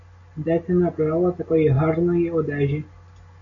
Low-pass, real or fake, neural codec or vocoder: 7.2 kHz; real; none